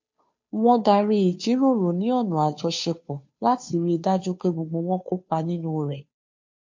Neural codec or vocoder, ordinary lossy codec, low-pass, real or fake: codec, 16 kHz, 2 kbps, FunCodec, trained on Chinese and English, 25 frames a second; MP3, 48 kbps; 7.2 kHz; fake